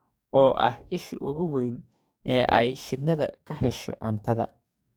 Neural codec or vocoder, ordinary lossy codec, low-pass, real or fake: codec, 44.1 kHz, 2.6 kbps, DAC; none; none; fake